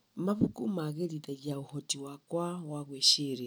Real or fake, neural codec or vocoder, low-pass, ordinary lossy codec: real; none; none; none